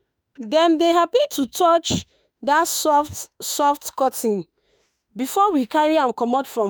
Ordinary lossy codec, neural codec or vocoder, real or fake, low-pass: none; autoencoder, 48 kHz, 32 numbers a frame, DAC-VAE, trained on Japanese speech; fake; none